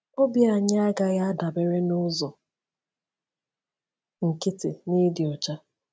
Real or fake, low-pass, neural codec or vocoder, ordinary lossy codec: real; none; none; none